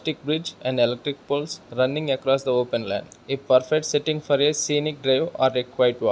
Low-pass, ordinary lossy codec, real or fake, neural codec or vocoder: none; none; real; none